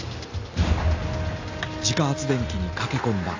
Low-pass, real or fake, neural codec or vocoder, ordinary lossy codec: 7.2 kHz; real; none; AAC, 48 kbps